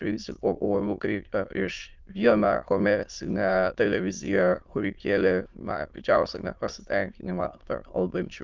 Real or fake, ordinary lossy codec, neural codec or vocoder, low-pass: fake; Opus, 24 kbps; autoencoder, 22.05 kHz, a latent of 192 numbers a frame, VITS, trained on many speakers; 7.2 kHz